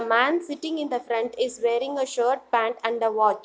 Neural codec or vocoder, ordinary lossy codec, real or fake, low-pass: none; none; real; none